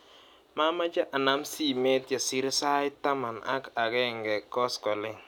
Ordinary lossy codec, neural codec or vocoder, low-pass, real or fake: none; none; 19.8 kHz; real